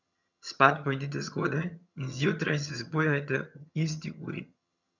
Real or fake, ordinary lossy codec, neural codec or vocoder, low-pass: fake; none; vocoder, 22.05 kHz, 80 mel bands, HiFi-GAN; 7.2 kHz